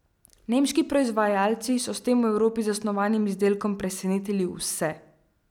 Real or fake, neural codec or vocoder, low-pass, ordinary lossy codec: real; none; 19.8 kHz; none